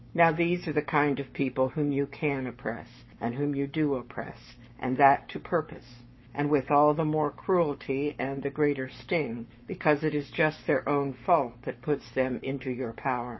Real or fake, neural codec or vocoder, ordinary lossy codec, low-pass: fake; codec, 44.1 kHz, 7.8 kbps, Pupu-Codec; MP3, 24 kbps; 7.2 kHz